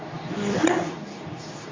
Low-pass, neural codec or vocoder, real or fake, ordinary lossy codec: 7.2 kHz; codec, 24 kHz, 0.9 kbps, WavTokenizer, medium speech release version 2; fake; AAC, 32 kbps